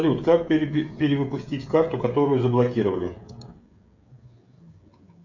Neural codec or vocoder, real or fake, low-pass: codec, 16 kHz, 16 kbps, FreqCodec, smaller model; fake; 7.2 kHz